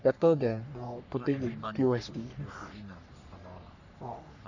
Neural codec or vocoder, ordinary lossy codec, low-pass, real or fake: codec, 44.1 kHz, 3.4 kbps, Pupu-Codec; none; 7.2 kHz; fake